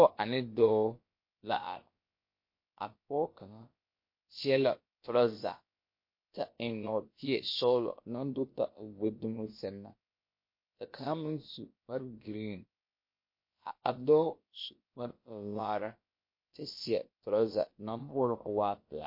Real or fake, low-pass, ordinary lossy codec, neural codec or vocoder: fake; 5.4 kHz; MP3, 32 kbps; codec, 16 kHz, about 1 kbps, DyCAST, with the encoder's durations